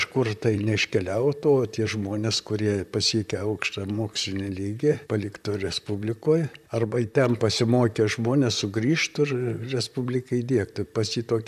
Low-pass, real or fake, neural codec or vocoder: 14.4 kHz; fake; vocoder, 44.1 kHz, 128 mel bands, Pupu-Vocoder